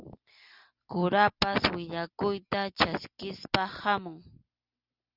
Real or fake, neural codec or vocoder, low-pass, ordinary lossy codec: real; none; 5.4 kHz; Opus, 64 kbps